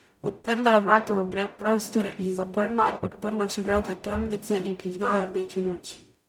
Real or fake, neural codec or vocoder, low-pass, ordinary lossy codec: fake; codec, 44.1 kHz, 0.9 kbps, DAC; 19.8 kHz; none